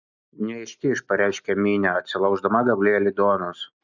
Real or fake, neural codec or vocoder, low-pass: real; none; 7.2 kHz